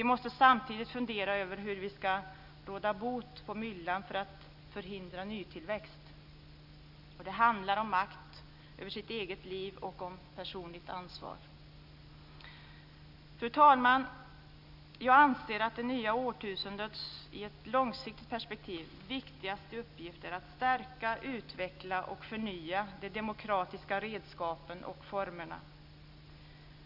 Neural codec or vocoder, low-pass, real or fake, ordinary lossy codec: none; 5.4 kHz; real; none